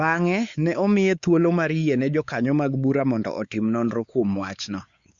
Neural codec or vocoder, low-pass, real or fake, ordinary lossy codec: codec, 16 kHz, 4 kbps, X-Codec, WavLM features, trained on Multilingual LibriSpeech; 7.2 kHz; fake; Opus, 64 kbps